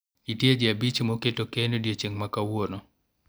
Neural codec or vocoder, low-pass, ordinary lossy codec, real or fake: none; none; none; real